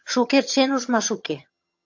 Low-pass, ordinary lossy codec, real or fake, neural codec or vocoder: 7.2 kHz; AAC, 48 kbps; fake; vocoder, 22.05 kHz, 80 mel bands, HiFi-GAN